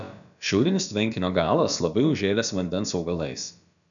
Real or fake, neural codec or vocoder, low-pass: fake; codec, 16 kHz, about 1 kbps, DyCAST, with the encoder's durations; 7.2 kHz